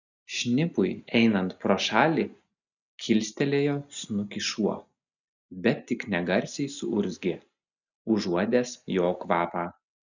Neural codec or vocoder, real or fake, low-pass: none; real; 7.2 kHz